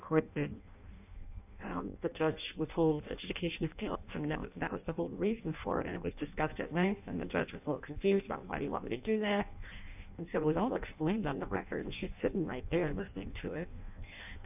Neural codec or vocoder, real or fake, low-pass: codec, 16 kHz in and 24 kHz out, 0.6 kbps, FireRedTTS-2 codec; fake; 3.6 kHz